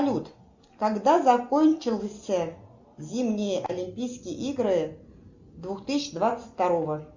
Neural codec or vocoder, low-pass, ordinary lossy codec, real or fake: none; 7.2 kHz; Opus, 64 kbps; real